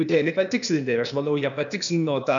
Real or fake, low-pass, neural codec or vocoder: fake; 7.2 kHz; codec, 16 kHz, 0.8 kbps, ZipCodec